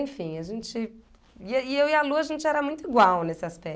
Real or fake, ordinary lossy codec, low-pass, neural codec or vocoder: real; none; none; none